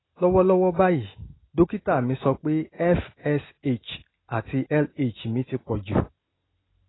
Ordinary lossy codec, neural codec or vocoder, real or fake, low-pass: AAC, 16 kbps; none; real; 7.2 kHz